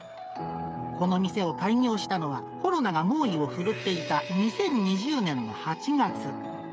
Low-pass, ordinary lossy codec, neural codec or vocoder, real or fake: none; none; codec, 16 kHz, 8 kbps, FreqCodec, smaller model; fake